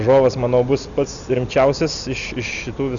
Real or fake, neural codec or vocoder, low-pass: real; none; 7.2 kHz